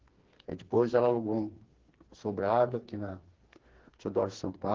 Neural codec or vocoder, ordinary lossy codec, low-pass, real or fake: codec, 16 kHz, 4 kbps, FreqCodec, smaller model; Opus, 16 kbps; 7.2 kHz; fake